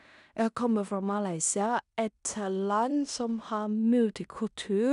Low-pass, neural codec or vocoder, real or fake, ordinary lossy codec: 10.8 kHz; codec, 16 kHz in and 24 kHz out, 0.9 kbps, LongCat-Audio-Codec, fine tuned four codebook decoder; fake; none